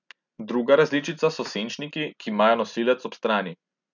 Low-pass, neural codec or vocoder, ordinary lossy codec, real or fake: 7.2 kHz; none; none; real